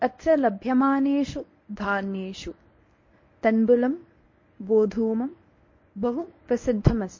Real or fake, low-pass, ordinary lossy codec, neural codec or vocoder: fake; 7.2 kHz; MP3, 32 kbps; codec, 24 kHz, 0.9 kbps, WavTokenizer, medium speech release version 1